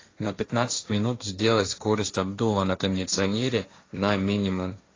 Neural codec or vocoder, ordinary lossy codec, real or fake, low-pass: codec, 16 kHz, 1.1 kbps, Voila-Tokenizer; AAC, 32 kbps; fake; 7.2 kHz